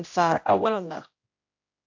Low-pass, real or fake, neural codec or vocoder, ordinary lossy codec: 7.2 kHz; fake; codec, 16 kHz, 0.5 kbps, X-Codec, HuBERT features, trained on general audio; AAC, 48 kbps